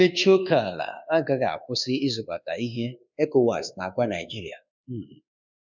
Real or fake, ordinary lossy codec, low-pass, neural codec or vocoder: fake; none; 7.2 kHz; codec, 24 kHz, 1.2 kbps, DualCodec